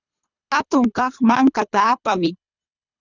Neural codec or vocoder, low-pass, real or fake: codec, 24 kHz, 3 kbps, HILCodec; 7.2 kHz; fake